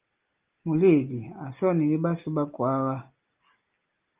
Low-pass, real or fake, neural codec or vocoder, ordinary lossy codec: 3.6 kHz; real; none; Opus, 32 kbps